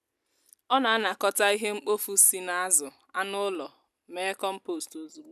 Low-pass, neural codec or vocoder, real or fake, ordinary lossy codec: 14.4 kHz; none; real; none